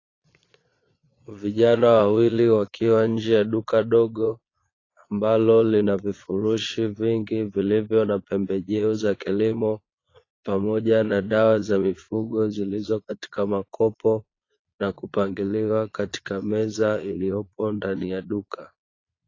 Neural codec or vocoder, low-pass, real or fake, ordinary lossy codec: vocoder, 22.05 kHz, 80 mel bands, Vocos; 7.2 kHz; fake; AAC, 32 kbps